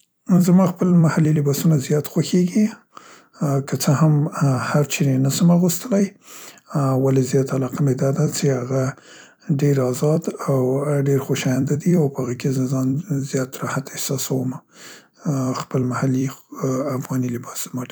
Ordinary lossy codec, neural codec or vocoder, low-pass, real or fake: none; vocoder, 44.1 kHz, 128 mel bands every 256 samples, BigVGAN v2; none; fake